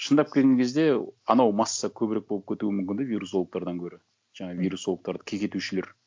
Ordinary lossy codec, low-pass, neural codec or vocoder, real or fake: none; none; none; real